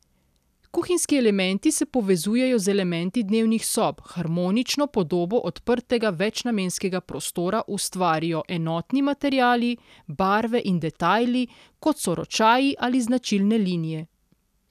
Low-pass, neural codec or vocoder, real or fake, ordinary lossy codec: 14.4 kHz; none; real; none